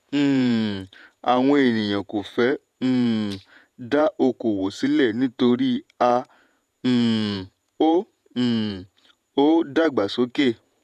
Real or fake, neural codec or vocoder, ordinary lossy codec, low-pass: fake; vocoder, 48 kHz, 128 mel bands, Vocos; none; 14.4 kHz